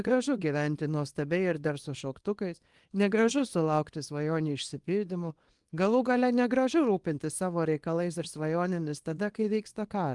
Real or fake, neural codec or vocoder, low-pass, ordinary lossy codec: fake; codec, 24 kHz, 0.9 kbps, WavTokenizer, small release; 10.8 kHz; Opus, 24 kbps